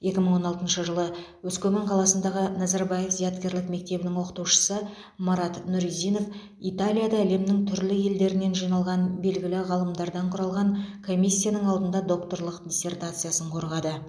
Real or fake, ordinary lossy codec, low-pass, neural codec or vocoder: real; none; none; none